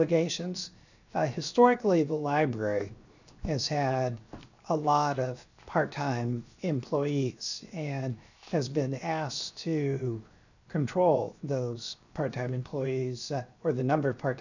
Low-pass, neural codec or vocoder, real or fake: 7.2 kHz; codec, 16 kHz, 0.7 kbps, FocalCodec; fake